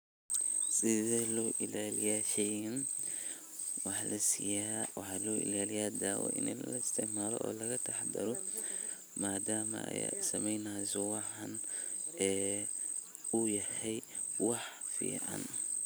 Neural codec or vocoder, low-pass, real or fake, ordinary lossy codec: none; none; real; none